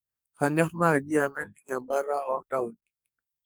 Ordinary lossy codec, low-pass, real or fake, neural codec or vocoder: none; none; fake; codec, 44.1 kHz, 2.6 kbps, SNAC